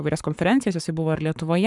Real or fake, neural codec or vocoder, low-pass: fake; codec, 44.1 kHz, 7.8 kbps, Pupu-Codec; 10.8 kHz